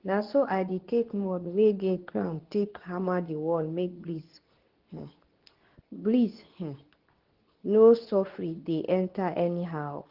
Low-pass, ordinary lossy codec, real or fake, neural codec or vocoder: 5.4 kHz; Opus, 16 kbps; fake; codec, 24 kHz, 0.9 kbps, WavTokenizer, medium speech release version 2